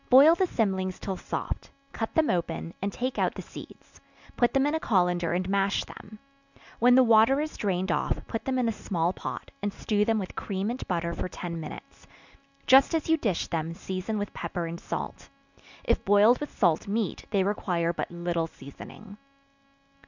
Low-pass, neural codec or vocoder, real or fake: 7.2 kHz; none; real